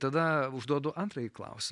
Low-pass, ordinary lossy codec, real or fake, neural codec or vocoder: 10.8 kHz; MP3, 96 kbps; real; none